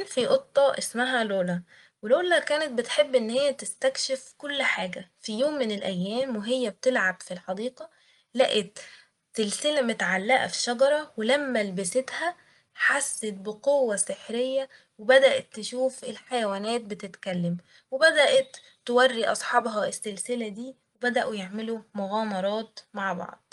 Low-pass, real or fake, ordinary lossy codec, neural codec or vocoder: 10.8 kHz; real; Opus, 32 kbps; none